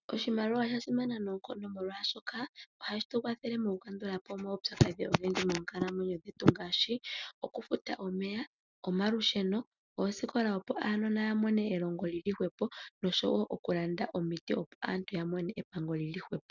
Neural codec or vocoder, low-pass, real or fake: none; 7.2 kHz; real